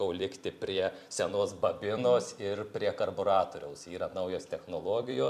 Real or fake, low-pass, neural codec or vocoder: fake; 14.4 kHz; vocoder, 44.1 kHz, 128 mel bands every 256 samples, BigVGAN v2